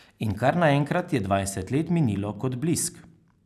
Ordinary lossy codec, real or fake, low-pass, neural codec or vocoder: none; real; 14.4 kHz; none